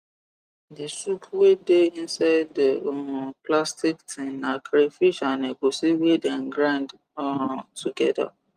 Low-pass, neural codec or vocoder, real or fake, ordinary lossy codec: 14.4 kHz; none; real; Opus, 32 kbps